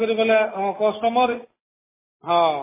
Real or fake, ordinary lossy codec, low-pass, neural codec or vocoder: real; MP3, 16 kbps; 3.6 kHz; none